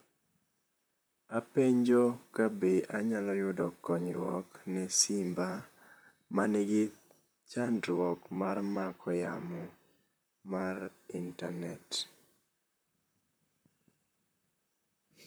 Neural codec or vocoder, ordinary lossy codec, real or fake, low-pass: vocoder, 44.1 kHz, 128 mel bands, Pupu-Vocoder; none; fake; none